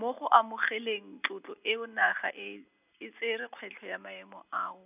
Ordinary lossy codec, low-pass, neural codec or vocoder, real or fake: none; 3.6 kHz; none; real